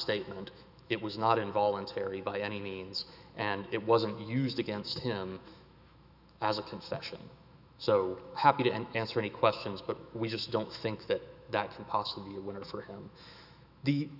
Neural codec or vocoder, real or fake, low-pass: autoencoder, 48 kHz, 128 numbers a frame, DAC-VAE, trained on Japanese speech; fake; 5.4 kHz